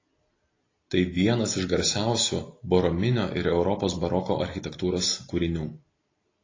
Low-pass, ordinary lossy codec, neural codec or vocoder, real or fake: 7.2 kHz; AAC, 32 kbps; none; real